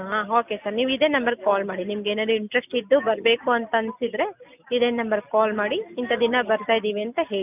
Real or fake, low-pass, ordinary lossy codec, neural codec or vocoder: real; 3.6 kHz; none; none